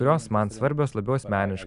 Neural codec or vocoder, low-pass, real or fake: none; 10.8 kHz; real